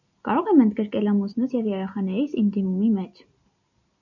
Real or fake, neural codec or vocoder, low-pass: real; none; 7.2 kHz